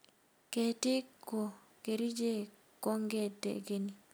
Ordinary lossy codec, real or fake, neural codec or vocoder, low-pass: none; real; none; none